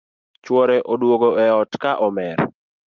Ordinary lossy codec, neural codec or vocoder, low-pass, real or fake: Opus, 32 kbps; none; 7.2 kHz; real